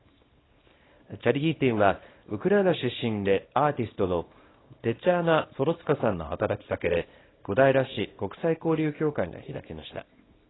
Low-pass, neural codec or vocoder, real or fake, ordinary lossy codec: 7.2 kHz; codec, 24 kHz, 0.9 kbps, WavTokenizer, small release; fake; AAC, 16 kbps